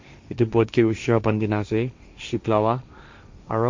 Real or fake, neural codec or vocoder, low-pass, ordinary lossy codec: fake; codec, 16 kHz, 1.1 kbps, Voila-Tokenizer; 7.2 kHz; MP3, 48 kbps